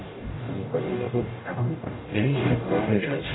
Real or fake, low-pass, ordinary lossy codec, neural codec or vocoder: fake; 7.2 kHz; AAC, 16 kbps; codec, 44.1 kHz, 0.9 kbps, DAC